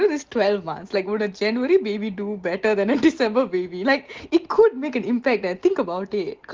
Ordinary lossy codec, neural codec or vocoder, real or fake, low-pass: Opus, 16 kbps; none; real; 7.2 kHz